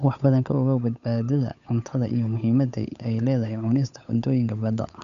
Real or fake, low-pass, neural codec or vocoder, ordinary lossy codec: fake; 7.2 kHz; codec, 16 kHz, 8 kbps, FunCodec, trained on Chinese and English, 25 frames a second; none